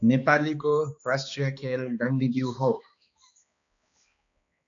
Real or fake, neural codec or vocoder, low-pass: fake; codec, 16 kHz, 2 kbps, X-Codec, HuBERT features, trained on balanced general audio; 7.2 kHz